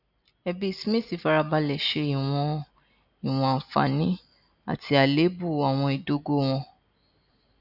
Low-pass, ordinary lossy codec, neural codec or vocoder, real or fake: 5.4 kHz; none; none; real